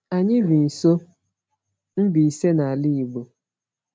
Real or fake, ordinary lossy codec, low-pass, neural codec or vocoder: real; none; none; none